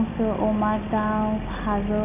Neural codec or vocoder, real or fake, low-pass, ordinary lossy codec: none; real; 3.6 kHz; none